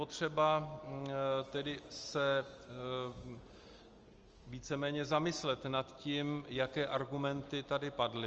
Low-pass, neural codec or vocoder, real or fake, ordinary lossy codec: 7.2 kHz; none; real; Opus, 24 kbps